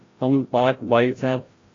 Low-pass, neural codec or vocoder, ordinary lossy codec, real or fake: 7.2 kHz; codec, 16 kHz, 0.5 kbps, FreqCodec, larger model; AAC, 32 kbps; fake